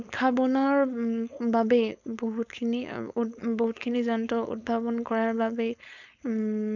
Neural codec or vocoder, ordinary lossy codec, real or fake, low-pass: codec, 16 kHz, 4.8 kbps, FACodec; none; fake; 7.2 kHz